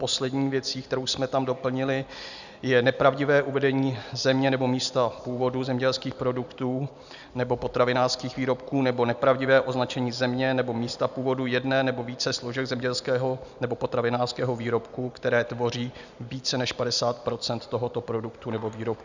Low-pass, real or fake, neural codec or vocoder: 7.2 kHz; real; none